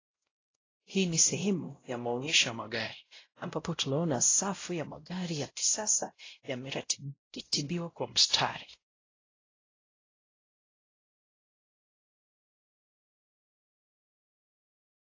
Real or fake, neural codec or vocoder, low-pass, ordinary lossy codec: fake; codec, 16 kHz, 0.5 kbps, X-Codec, WavLM features, trained on Multilingual LibriSpeech; 7.2 kHz; AAC, 32 kbps